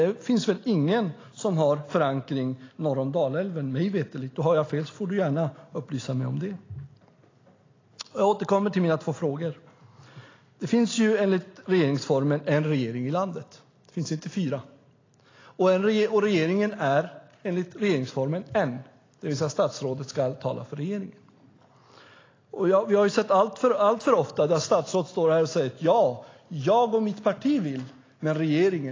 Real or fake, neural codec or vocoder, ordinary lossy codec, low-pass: real; none; AAC, 32 kbps; 7.2 kHz